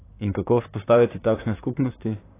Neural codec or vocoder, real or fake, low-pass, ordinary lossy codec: vocoder, 44.1 kHz, 128 mel bands, Pupu-Vocoder; fake; 3.6 kHz; AAC, 16 kbps